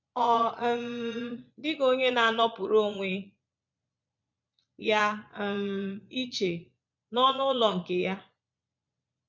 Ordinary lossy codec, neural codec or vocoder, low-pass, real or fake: MP3, 64 kbps; vocoder, 22.05 kHz, 80 mel bands, Vocos; 7.2 kHz; fake